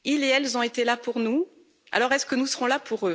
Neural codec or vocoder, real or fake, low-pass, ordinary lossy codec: none; real; none; none